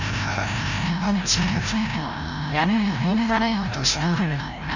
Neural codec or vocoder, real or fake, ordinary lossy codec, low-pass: codec, 16 kHz, 0.5 kbps, FreqCodec, larger model; fake; none; 7.2 kHz